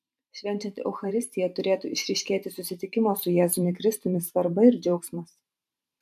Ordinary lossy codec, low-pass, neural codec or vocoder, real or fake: MP3, 96 kbps; 14.4 kHz; vocoder, 44.1 kHz, 128 mel bands every 512 samples, BigVGAN v2; fake